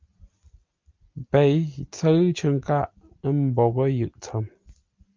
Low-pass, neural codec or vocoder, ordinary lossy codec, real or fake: 7.2 kHz; none; Opus, 24 kbps; real